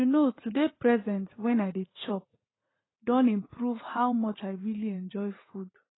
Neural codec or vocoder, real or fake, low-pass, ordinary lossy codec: vocoder, 44.1 kHz, 80 mel bands, Vocos; fake; 7.2 kHz; AAC, 16 kbps